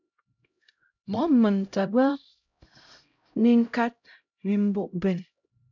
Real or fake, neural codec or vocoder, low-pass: fake; codec, 16 kHz, 0.5 kbps, X-Codec, HuBERT features, trained on LibriSpeech; 7.2 kHz